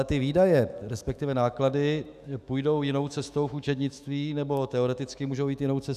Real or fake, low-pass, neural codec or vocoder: fake; 14.4 kHz; autoencoder, 48 kHz, 128 numbers a frame, DAC-VAE, trained on Japanese speech